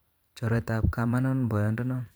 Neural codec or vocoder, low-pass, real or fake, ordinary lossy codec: vocoder, 44.1 kHz, 128 mel bands every 512 samples, BigVGAN v2; none; fake; none